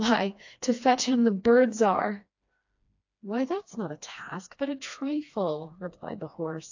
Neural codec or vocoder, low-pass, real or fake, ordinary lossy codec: codec, 16 kHz, 2 kbps, FreqCodec, smaller model; 7.2 kHz; fake; AAC, 48 kbps